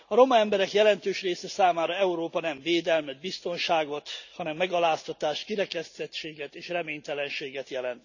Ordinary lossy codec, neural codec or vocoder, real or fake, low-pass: none; none; real; 7.2 kHz